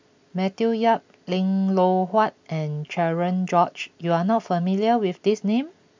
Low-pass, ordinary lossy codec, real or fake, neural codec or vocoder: 7.2 kHz; MP3, 64 kbps; real; none